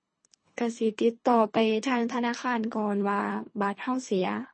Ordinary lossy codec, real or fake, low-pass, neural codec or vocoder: MP3, 32 kbps; fake; 9.9 kHz; codec, 24 kHz, 3 kbps, HILCodec